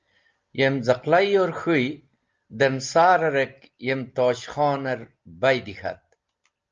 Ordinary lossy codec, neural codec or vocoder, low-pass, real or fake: Opus, 24 kbps; none; 7.2 kHz; real